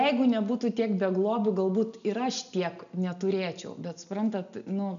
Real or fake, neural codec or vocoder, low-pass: real; none; 7.2 kHz